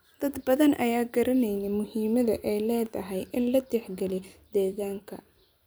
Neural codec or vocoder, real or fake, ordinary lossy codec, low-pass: vocoder, 44.1 kHz, 128 mel bands every 256 samples, BigVGAN v2; fake; none; none